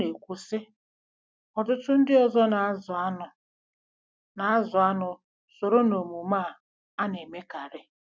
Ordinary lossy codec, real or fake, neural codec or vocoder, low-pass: none; real; none; none